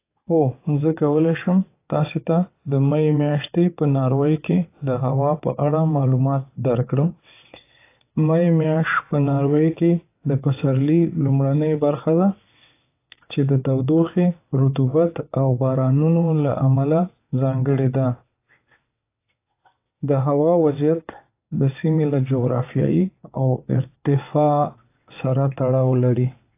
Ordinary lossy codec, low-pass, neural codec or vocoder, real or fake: AAC, 24 kbps; 3.6 kHz; vocoder, 22.05 kHz, 80 mel bands, WaveNeXt; fake